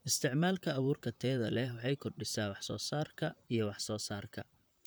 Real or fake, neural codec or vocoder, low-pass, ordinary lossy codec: fake; vocoder, 44.1 kHz, 128 mel bands, Pupu-Vocoder; none; none